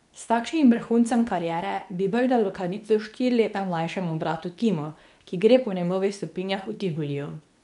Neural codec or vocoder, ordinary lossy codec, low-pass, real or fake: codec, 24 kHz, 0.9 kbps, WavTokenizer, medium speech release version 2; none; 10.8 kHz; fake